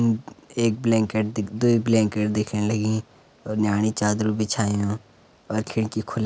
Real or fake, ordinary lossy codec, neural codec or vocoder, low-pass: real; none; none; none